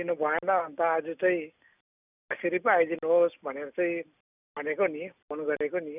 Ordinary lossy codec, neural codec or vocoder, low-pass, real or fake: none; none; 3.6 kHz; real